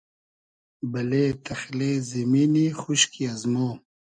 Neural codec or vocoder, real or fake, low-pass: none; real; 9.9 kHz